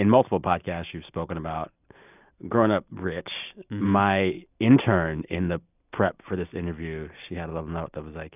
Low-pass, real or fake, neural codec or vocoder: 3.6 kHz; real; none